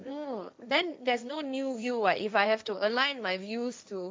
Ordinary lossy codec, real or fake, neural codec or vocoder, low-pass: none; fake; codec, 16 kHz, 1.1 kbps, Voila-Tokenizer; none